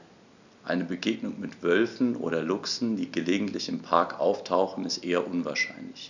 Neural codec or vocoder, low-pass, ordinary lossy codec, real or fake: none; 7.2 kHz; none; real